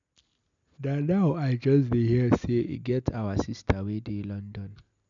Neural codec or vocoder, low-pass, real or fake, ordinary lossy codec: none; 7.2 kHz; real; none